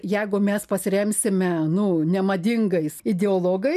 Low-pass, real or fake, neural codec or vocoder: 14.4 kHz; real; none